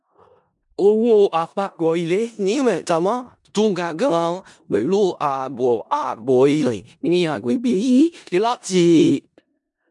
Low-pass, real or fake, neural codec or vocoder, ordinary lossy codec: 10.8 kHz; fake; codec, 16 kHz in and 24 kHz out, 0.4 kbps, LongCat-Audio-Codec, four codebook decoder; MP3, 96 kbps